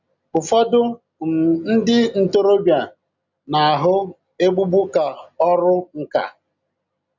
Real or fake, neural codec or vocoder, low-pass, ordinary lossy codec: real; none; 7.2 kHz; none